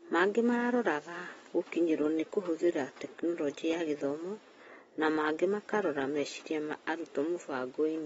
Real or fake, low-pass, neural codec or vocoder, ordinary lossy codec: real; 10.8 kHz; none; AAC, 24 kbps